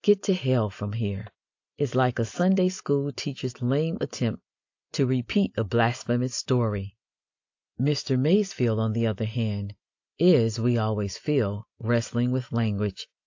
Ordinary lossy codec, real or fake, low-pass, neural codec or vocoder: AAC, 48 kbps; real; 7.2 kHz; none